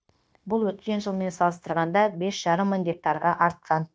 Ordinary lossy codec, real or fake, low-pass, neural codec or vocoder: none; fake; none; codec, 16 kHz, 0.9 kbps, LongCat-Audio-Codec